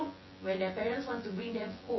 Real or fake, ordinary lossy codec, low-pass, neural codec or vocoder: fake; MP3, 24 kbps; 7.2 kHz; vocoder, 24 kHz, 100 mel bands, Vocos